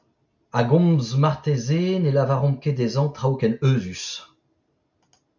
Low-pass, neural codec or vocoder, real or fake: 7.2 kHz; none; real